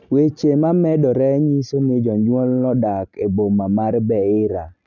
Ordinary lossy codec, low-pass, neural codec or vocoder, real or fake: none; 7.2 kHz; none; real